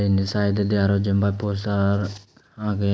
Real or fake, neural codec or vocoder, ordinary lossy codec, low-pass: real; none; none; none